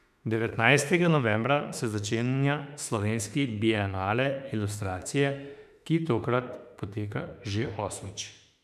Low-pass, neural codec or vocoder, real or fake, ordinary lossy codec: 14.4 kHz; autoencoder, 48 kHz, 32 numbers a frame, DAC-VAE, trained on Japanese speech; fake; none